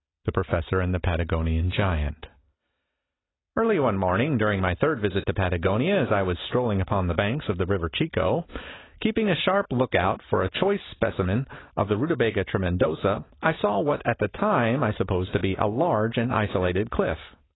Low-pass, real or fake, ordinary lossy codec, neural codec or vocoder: 7.2 kHz; real; AAC, 16 kbps; none